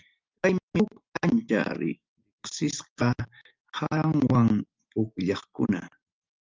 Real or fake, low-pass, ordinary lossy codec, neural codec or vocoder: real; 7.2 kHz; Opus, 32 kbps; none